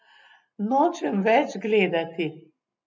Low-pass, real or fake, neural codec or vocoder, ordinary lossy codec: none; real; none; none